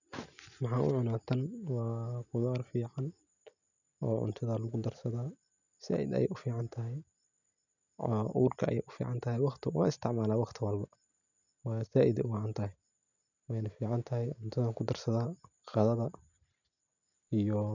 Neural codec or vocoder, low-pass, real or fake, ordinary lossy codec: none; 7.2 kHz; real; none